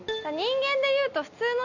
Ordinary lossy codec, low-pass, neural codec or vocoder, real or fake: none; 7.2 kHz; none; real